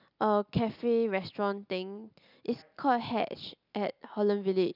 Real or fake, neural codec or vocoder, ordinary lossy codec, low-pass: real; none; none; 5.4 kHz